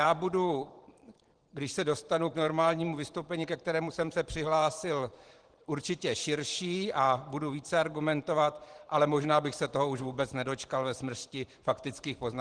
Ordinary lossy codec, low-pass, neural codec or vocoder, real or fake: Opus, 16 kbps; 9.9 kHz; vocoder, 44.1 kHz, 128 mel bands every 512 samples, BigVGAN v2; fake